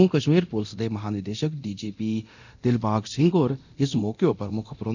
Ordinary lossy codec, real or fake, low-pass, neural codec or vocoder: none; fake; 7.2 kHz; codec, 24 kHz, 0.9 kbps, DualCodec